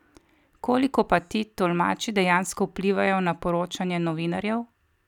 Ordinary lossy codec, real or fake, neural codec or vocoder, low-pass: none; real; none; 19.8 kHz